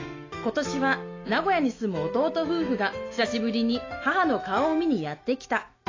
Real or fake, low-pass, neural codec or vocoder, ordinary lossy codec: fake; 7.2 kHz; autoencoder, 48 kHz, 128 numbers a frame, DAC-VAE, trained on Japanese speech; AAC, 32 kbps